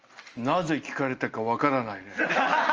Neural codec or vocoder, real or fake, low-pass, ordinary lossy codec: none; real; 7.2 kHz; Opus, 24 kbps